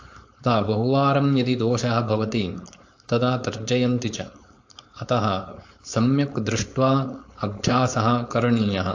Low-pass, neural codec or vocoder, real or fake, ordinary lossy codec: 7.2 kHz; codec, 16 kHz, 4.8 kbps, FACodec; fake; AAC, 48 kbps